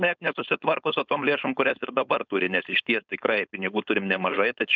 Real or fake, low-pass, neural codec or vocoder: fake; 7.2 kHz; codec, 16 kHz, 4.8 kbps, FACodec